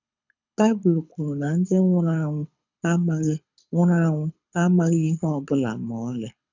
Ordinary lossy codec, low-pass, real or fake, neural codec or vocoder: none; 7.2 kHz; fake; codec, 24 kHz, 6 kbps, HILCodec